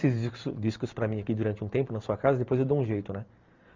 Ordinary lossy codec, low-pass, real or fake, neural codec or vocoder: Opus, 24 kbps; 7.2 kHz; real; none